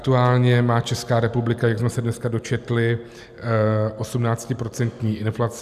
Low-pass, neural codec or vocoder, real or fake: 14.4 kHz; none; real